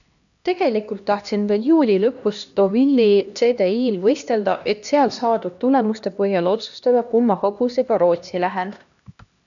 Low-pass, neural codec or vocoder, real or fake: 7.2 kHz; codec, 16 kHz, 1 kbps, X-Codec, HuBERT features, trained on LibriSpeech; fake